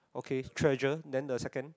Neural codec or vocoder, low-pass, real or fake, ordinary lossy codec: none; none; real; none